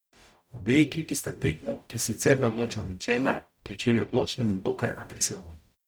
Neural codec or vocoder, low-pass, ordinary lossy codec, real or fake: codec, 44.1 kHz, 0.9 kbps, DAC; none; none; fake